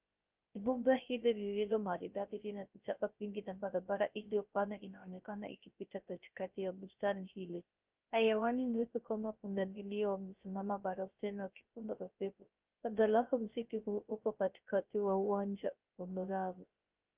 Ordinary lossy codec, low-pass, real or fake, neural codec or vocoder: Opus, 24 kbps; 3.6 kHz; fake; codec, 16 kHz, 0.3 kbps, FocalCodec